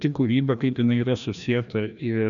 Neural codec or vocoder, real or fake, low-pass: codec, 16 kHz, 1 kbps, FreqCodec, larger model; fake; 7.2 kHz